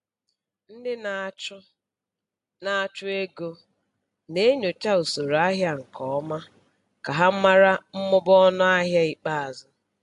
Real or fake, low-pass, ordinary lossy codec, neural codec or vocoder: real; 10.8 kHz; AAC, 64 kbps; none